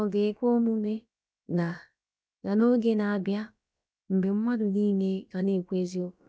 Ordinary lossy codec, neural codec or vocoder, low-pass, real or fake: none; codec, 16 kHz, about 1 kbps, DyCAST, with the encoder's durations; none; fake